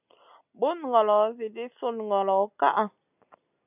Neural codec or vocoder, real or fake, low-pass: none; real; 3.6 kHz